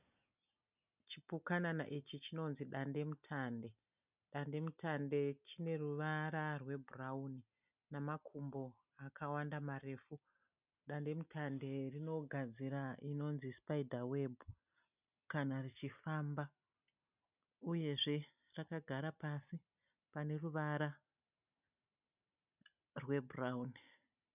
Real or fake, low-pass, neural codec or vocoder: real; 3.6 kHz; none